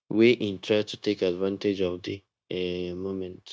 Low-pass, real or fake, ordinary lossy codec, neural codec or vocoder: none; fake; none; codec, 16 kHz, 0.9 kbps, LongCat-Audio-Codec